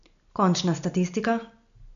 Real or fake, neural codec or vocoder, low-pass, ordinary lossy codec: real; none; 7.2 kHz; none